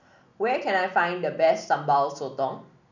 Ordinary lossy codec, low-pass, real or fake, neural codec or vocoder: none; 7.2 kHz; real; none